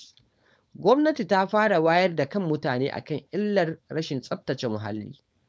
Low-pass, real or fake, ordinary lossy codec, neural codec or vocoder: none; fake; none; codec, 16 kHz, 4.8 kbps, FACodec